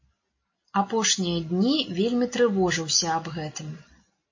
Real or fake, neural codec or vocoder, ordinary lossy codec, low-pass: real; none; MP3, 32 kbps; 7.2 kHz